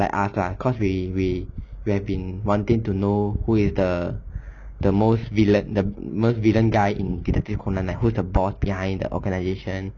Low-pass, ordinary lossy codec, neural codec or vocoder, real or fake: 7.2 kHz; none; none; real